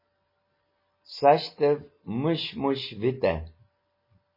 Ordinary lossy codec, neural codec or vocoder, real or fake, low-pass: MP3, 24 kbps; none; real; 5.4 kHz